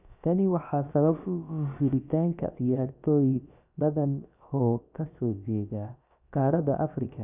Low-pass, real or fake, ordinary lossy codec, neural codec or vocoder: 3.6 kHz; fake; none; codec, 16 kHz, about 1 kbps, DyCAST, with the encoder's durations